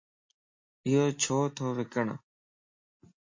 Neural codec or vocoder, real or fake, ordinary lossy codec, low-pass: none; real; MP3, 32 kbps; 7.2 kHz